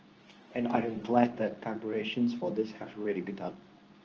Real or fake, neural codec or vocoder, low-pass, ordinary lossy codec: fake; codec, 24 kHz, 0.9 kbps, WavTokenizer, medium speech release version 2; 7.2 kHz; Opus, 24 kbps